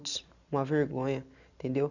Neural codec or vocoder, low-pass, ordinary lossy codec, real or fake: none; 7.2 kHz; none; real